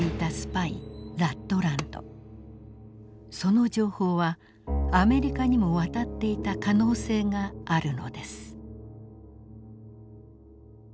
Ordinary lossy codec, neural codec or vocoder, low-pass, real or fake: none; none; none; real